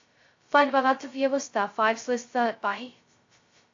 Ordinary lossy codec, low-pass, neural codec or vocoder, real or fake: AAC, 48 kbps; 7.2 kHz; codec, 16 kHz, 0.2 kbps, FocalCodec; fake